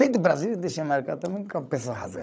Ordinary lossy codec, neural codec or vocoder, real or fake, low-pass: none; codec, 16 kHz, 16 kbps, FreqCodec, larger model; fake; none